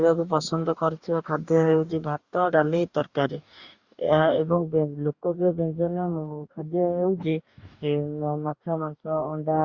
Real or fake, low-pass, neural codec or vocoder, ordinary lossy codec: fake; 7.2 kHz; codec, 44.1 kHz, 2.6 kbps, DAC; Opus, 64 kbps